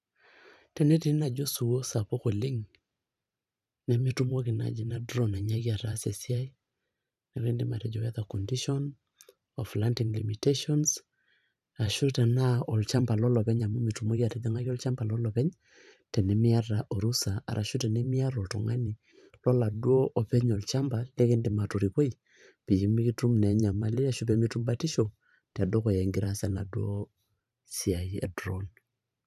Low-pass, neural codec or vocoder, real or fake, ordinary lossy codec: 14.4 kHz; vocoder, 44.1 kHz, 128 mel bands every 512 samples, BigVGAN v2; fake; none